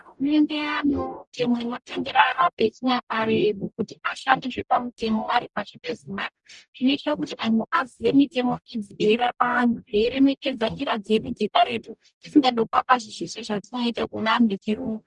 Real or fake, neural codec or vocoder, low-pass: fake; codec, 44.1 kHz, 0.9 kbps, DAC; 10.8 kHz